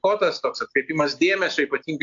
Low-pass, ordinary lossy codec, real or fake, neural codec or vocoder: 7.2 kHz; AAC, 64 kbps; real; none